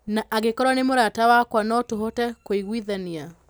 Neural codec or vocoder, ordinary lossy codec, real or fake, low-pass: none; none; real; none